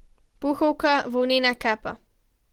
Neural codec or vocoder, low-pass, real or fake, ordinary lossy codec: none; 19.8 kHz; real; Opus, 16 kbps